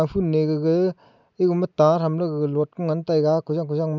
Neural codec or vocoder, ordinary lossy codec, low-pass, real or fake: none; none; 7.2 kHz; real